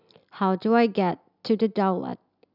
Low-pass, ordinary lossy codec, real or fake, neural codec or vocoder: 5.4 kHz; none; real; none